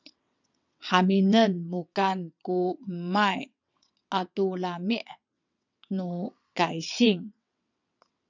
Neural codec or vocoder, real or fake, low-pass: vocoder, 22.05 kHz, 80 mel bands, WaveNeXt; fake; 7.2 kHz